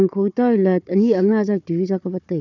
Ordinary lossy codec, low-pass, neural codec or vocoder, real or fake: none; 7.2 kHz; codec, 16 kHz, 4 kbps, FunCodec, trained on Chinese and English, 50 frames a second; fake